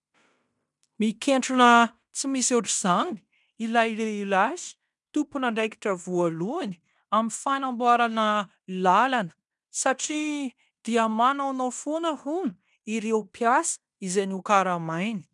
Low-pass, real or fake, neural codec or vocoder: 10.8 kHz; fake; codec, 16 kHz in and 24 kHz out, 0.9 kbps, LongCat-Audio-Codec, fine tuned four codebook decoder